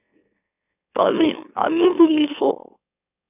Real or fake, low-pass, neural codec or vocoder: fake; 3.6 kHz; autoencoder, 44.1 kHz, a latent of 192 numbers a frame, MeloTTS